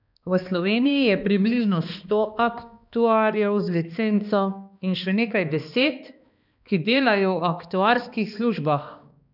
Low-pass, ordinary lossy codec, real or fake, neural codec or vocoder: 5.4 kHz; none; fake; codec, 16 kHz, 2 kbps, X-Codec, HuBERT features, trained on balanced general audio